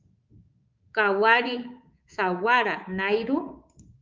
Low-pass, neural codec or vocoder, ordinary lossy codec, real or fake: 7.2 kHz; codec, 24 kHz, 3.1 kbps, DualCodec; Opus, 32 kbps; fake